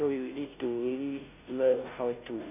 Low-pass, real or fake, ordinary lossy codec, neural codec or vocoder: 3.6 kHz; fake; none; codec, 16 kHz, 0.5 kbps, FunCodec, trained on Chinese and English, 25 frames a second